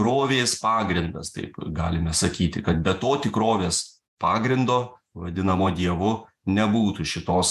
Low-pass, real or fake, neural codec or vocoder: 14.4 kHz; fake; vocoder, 48 kHz, 128 mel bands, Vocos